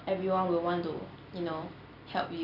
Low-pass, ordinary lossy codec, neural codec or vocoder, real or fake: 5.4 kHz; none; none; real